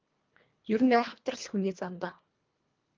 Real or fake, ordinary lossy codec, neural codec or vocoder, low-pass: fake; Opus, 32 kbps; codec, 24 kHz, 1.5 kbps, HILCodec; 7.2 kHz